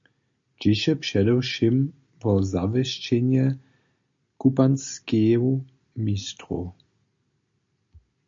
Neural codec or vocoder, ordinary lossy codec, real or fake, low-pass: none; MP3, 48 kbps; real; 7.2 kHz